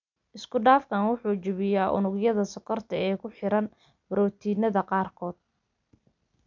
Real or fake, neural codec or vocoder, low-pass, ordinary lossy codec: real; none; 7.2 kHz; none